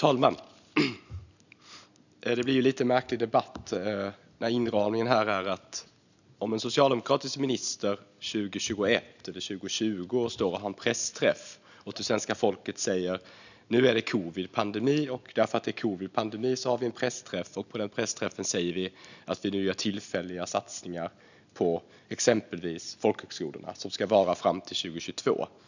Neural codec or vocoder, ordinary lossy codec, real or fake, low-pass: none; none; real; 7.2 kHz